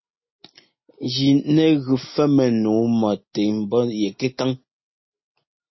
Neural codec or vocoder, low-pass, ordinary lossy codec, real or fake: none; 7.2 kHz; MP3, 24 kbps; real